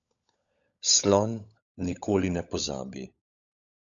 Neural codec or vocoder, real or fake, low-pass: codec, 16 kHz, 16 kbps, FunCodec, trained on LibriTTS, 50 frames a second; fake; 7.2 kHz